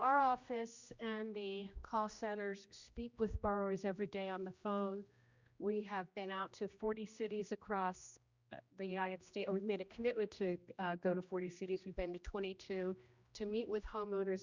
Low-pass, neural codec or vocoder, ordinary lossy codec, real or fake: 7.2 kHz; codec, 16 kHz, 1 kbps, X-Codec, HuBERT features, trained on general audio; Opus, 64 kbps; fake